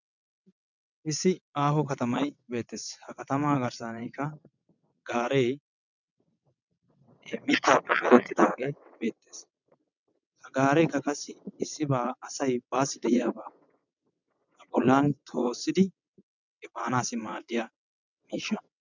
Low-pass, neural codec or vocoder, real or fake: 7.2 kHz; vocoder, 22.05 kHz, 80 mel bands, WaveNeXt; fake